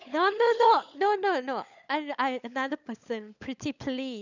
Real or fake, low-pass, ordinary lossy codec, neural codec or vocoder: fake; 7.2 kHz; none; codec, 16 kHz, 16 kbps, FunCodec, trained on LibriTTS, 50 frames a second